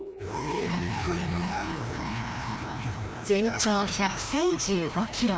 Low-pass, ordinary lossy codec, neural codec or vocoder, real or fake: none; none; codec, 16 kHz, 1 kbps, FreqCodec, larger model; fake